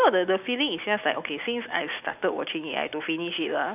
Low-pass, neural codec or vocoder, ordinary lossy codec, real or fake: 3.6 kHz; none; none; real